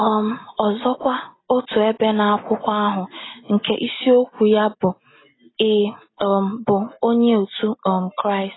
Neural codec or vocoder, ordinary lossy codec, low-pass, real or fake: none; AAC, 16 kbps; 7.2 kHz; real